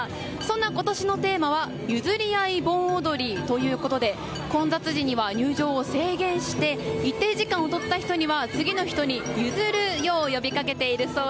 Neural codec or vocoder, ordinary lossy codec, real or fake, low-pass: none; none; real; none